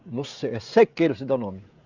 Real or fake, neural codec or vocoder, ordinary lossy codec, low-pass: fake; codec, 16 kHz, 16 kbps, FreqCodec, smaller model; Opus, 64 kbps; 7.2 kHz